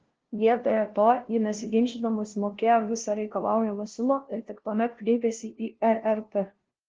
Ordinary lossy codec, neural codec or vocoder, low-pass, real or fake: Opus, 16 kbps; codec, 16 kHz, 0.5 kbps, FunCodec, trained on LibriTTS, 25 frames a second; 7.2 kHz; fake